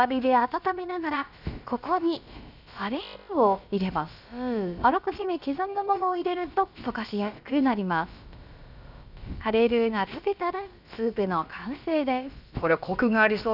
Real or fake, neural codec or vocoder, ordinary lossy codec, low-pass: fake; codec, 16 kHz, about 1 kbps, DyCAST, with the encoder's durations; none; 5.4 kHz